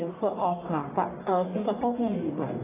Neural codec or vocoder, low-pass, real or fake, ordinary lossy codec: codec, 44.1 kHz, 1.7 kbps, Pupu-Codec; 3.6 kHz; fake; AAC, 16 kbps